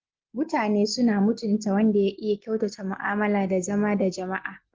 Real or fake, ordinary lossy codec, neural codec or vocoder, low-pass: real; Opus, 16 kbps; none; 7.2 kHz